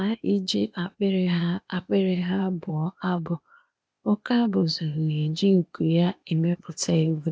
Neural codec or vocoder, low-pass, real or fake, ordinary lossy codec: codec, 16 kHz, 0.8 kbps, ZipCodec; none; fake; none